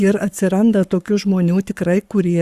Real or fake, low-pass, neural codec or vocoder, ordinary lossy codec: fake; 14.4 kHz; codec, 44.1 kHz, 7.8 kbps, Pupu-Codec; Opus, 64 kbps